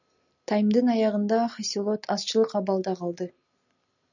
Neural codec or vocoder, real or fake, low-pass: none; real; 7.2 kHz